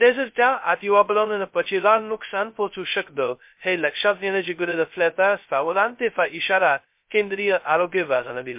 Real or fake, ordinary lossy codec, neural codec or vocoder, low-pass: fake; MP3, 32 kbps; codec, 16 kHz, 0.2 kbps, FocalCodec; 3.6 kHz